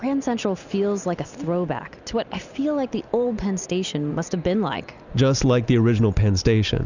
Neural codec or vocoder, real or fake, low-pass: none; real; 7.2 kHz